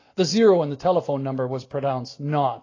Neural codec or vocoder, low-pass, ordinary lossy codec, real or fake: none; 7.2 kHz; AAC, 32 kbps; real